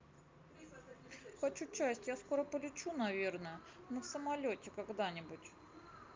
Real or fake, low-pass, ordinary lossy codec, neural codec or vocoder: real; 7.2 kHz; Opus, 24 kbps; none